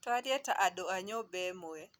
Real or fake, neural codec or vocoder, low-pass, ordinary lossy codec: real; none; none; none